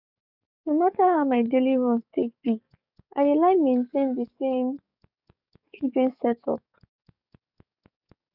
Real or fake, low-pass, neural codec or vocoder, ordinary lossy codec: fake; 5.4 kHz; codec, 44.1 kHz, 7.8 kbps, DAC; none